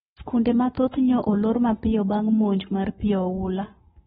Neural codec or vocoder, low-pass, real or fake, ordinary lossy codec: codec, 44.1 kHz, 7.8 kbps, Pupu-Codec; 19.8 kHz; fake; AAC, 16 kbps